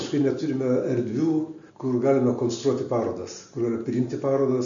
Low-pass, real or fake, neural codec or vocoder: 7.2 kHz; real; none